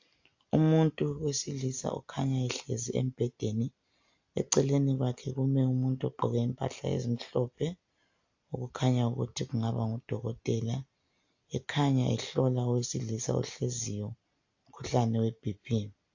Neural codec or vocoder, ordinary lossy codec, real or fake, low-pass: none; AAC, 48 kbps; real; 7.2 kHz